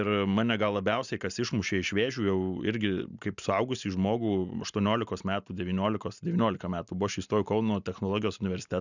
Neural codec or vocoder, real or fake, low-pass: none; real; 7.2 kHz